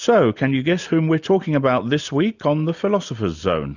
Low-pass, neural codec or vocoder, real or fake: 7.2 kHz; none; real